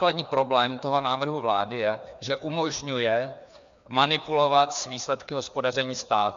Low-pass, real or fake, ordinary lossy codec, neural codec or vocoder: 7.2 kHz; fake; MP3, 64 kbps; codec, 16 kHz, 2 kbps, FreqCodec, larger model